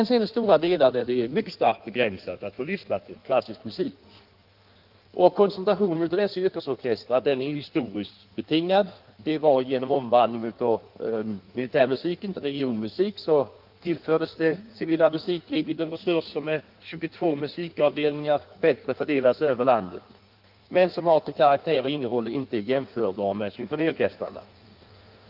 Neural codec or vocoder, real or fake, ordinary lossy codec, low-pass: codec, 16 kHz in and 24 kHz out, 1.1 kbps, FireRedTTS-2 codec; fake; Opus, 32 kbps; 5.4 kHz